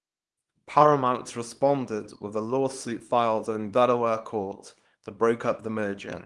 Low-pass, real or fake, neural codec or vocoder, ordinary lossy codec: 10.8 kHz; fake; codec, 24 kHz, 0.9 kbps, WavTokenizer, small release; Opus, 24 kbps